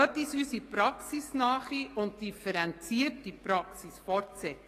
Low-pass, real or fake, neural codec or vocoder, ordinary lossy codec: 14.4 kHz; fake; codec, 44.1 kHz, 7.8 kbps, DAC; AAC, 48 kbps